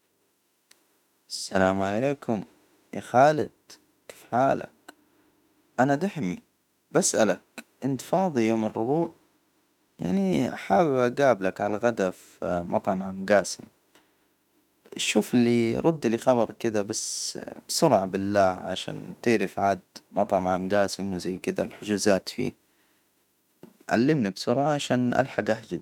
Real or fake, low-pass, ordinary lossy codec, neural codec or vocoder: fake; 19.8 kHz; none; autoencoder, 48 kHz, 32 numbers a frame, DAC-VAE, trained on Japanese speech